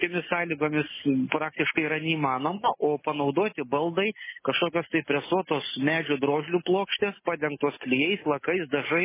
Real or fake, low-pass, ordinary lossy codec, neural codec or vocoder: real; 3.6 kHz; MP3, 16 kbps; none